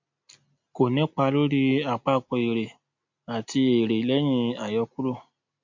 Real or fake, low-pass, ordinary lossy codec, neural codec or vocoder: real; 7.2 kHz; MP3, 48 kbps; none